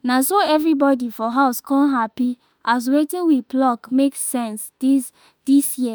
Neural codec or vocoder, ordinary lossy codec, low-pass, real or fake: autoencoder, 48 kHz, 32 numbers a frame, DAC-VAE, trained on Japanese speech; none; none; fake